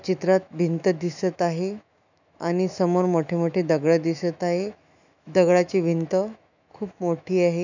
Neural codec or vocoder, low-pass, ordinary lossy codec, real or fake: autoencoder, 48 kHz, 128 numbers a frame, DAC-VAE, trained on Japanese speech; 7.2 kHz; none; fake